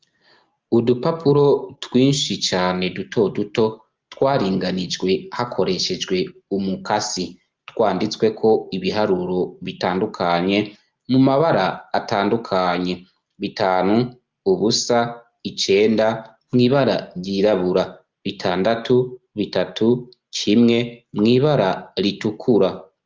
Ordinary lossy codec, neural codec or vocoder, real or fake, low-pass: Opus, 24 kbps; none; real; 7.2 kHz